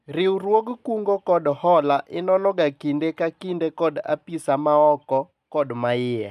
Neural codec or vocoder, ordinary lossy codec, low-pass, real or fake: none; none; 14.4 kHz; real